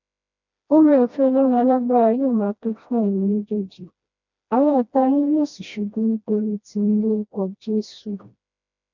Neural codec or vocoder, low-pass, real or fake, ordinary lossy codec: codec, 16 kHz, 1 kbps, FreqCodec, smaller model; 7.2 kHz; fake; none